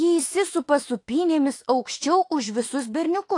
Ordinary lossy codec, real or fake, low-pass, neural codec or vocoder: AAC, 48 kbps; fake; 10.8 kHz; autoencoder, 48 kHz, 32 numbers a frame, DAC-VAE, trained on Japanese speech